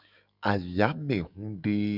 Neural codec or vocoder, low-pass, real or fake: autoencoder, 48 kHz, 128 numbers a frame, DAC-VAE, trained on Japanese speech; 5.4 kHz; fake